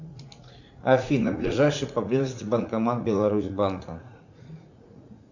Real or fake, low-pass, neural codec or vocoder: fake; 7.2 kHz; vocoder, 44.1 kHz, 80 mel bands, Vocos